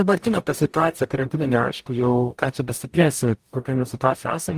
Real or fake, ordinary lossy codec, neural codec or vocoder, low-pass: fake; Opus, 24 kbps; codec, 44.1 kHz, 0.9 kbps, DAC; 14.4 kHz